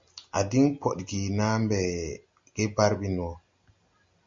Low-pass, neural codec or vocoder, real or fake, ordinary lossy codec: 7.2 kHz; none; real; MP3, 48 kbps